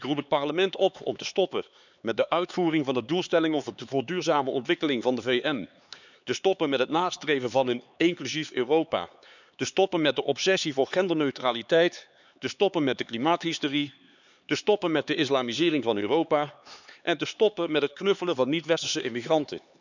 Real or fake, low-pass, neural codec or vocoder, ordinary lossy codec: fake; 7.2 kHz; codec, 16 kHz, 4 kbps, X-Codec, HuBERT features, trained on LibriSpeech; none